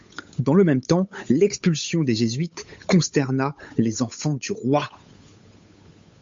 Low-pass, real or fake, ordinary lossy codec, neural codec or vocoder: 7.2 kHz; fake; MP3, 48 kbps; codec, 16 kHz, 8 kbps, FunCodec, trained on Chinese and English, 25 frames a second